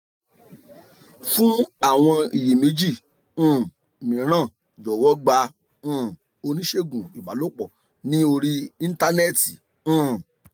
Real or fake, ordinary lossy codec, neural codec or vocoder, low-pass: real; none; none; none